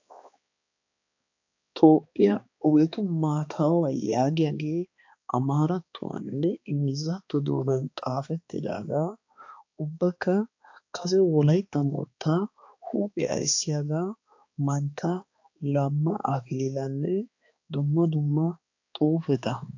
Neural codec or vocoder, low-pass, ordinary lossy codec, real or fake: codec, 16 kHz, 2 kbps, X-Codec, HuBERT features, trained on balanced general audio; 7.2 kHz; AAC, 48 kbps; fake